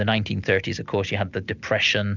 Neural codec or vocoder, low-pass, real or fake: none; 7.2 kHz; real